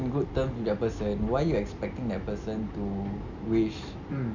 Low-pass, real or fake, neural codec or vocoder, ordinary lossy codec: 7.2 kHz; real; none; none